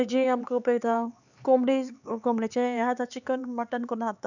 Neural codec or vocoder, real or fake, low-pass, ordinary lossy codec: codec, 16 kHz, 4 kbps, X-Codec, HuBERT features, trained on LibriSpeech; fake; 7.2 kHz; none